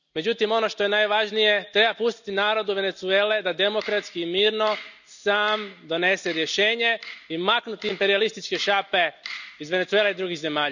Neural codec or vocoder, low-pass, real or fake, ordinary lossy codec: none; 7.2 kHz; real; none